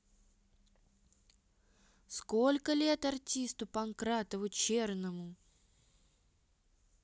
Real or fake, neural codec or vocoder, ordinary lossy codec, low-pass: real; none; none; none